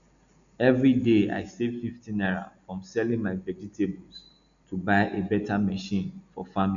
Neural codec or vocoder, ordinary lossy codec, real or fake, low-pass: none; none; real; 7.2 kHz